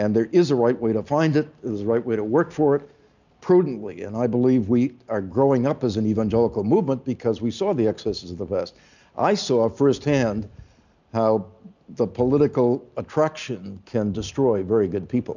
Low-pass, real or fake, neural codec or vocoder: 7.2 kHz; real; none